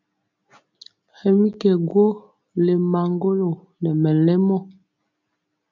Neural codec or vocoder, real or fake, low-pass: none; real; 7.2 kHz